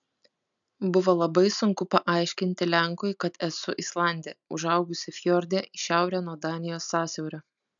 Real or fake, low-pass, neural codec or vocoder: real; 7.2 kHz; none